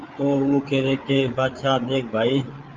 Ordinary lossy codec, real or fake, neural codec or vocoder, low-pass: Opus, 24 kbps; fake; codec, 16 kHz, 8 kbps, FreqCodec, larger model; 7.2 kHz